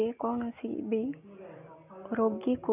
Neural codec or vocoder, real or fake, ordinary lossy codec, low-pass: none; real; none; 3.6 kHz